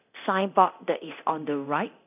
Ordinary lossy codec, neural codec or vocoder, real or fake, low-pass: none; codec, 24 kHz, 0.9 kbps, DualCodec; fake; 3.6 kHz